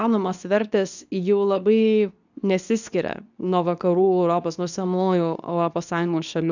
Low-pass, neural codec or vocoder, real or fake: 7.2 kHz; codec, 24 kHz, 0.9 kbps, WavTokenizer, medium speech release version 2; fake